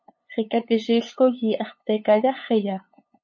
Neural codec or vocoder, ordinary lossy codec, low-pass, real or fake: codec, 16 kHz, 8 kbps, FunCodec, trained on LibriTTS, 25 frames a second; MP3, 32 kbps; 7.2 kHz; fake